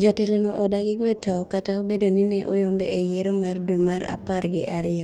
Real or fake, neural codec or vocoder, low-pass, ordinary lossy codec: fake; codec, 44.1 kHz, 2.6 kbps, DAC; 19.8 kHz; none